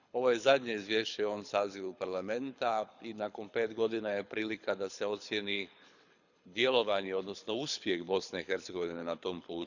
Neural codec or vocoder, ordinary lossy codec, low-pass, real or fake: codec, 24 kHz, 6 kbps, HILCodec; none; 7.2 kHz; fake